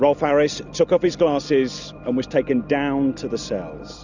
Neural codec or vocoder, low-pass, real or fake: none; 7.2 kHz; real